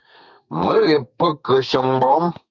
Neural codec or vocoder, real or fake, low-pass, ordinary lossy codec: codec, 32 kHz, 1.9 kbps, SNAC; fake; 7.2 kHz; Opus, 64 kbps